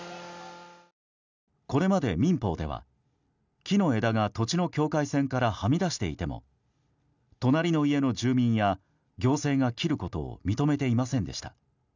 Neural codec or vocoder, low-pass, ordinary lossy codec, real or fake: none; 7.2 kHz; none; real